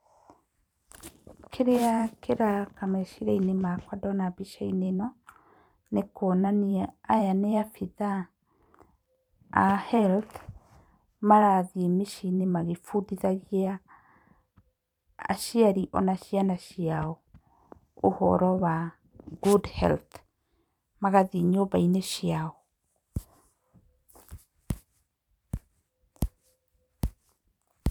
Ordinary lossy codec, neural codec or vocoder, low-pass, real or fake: none; vocoder, 44.1 kHz, 128 mel bands every 512 samples, BigVGAN v2; 19.8 kHz; fake